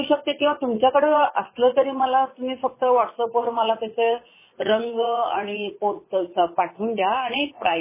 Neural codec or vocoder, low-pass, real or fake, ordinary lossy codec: vocoder, 44.1 kHz, 80 mel bands, Vocos; 3.6 kHz; fake; MP3, 16 kbps